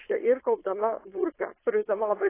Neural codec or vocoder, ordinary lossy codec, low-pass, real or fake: codec, 16 kHz, 4.8 kbps, FACodec; AAC, 16 kbps; 3.6 kHz; fake